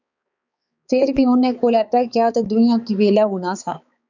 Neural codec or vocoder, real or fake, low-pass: codec, 16 kHz, 4 kbps, X-Codec, HuBERT features, trained on balanced general audio; fake; 7.2 kHz